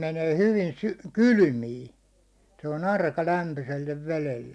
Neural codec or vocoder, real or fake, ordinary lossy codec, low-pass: none; real; none; none